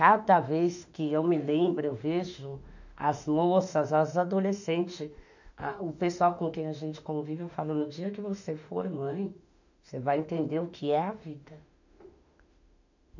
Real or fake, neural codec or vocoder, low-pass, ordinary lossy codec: fake; autoencoder, 48 kHz, 32 numbers a frame, DAC-VAE, trained on Japanese speech; 7.2 kHz; none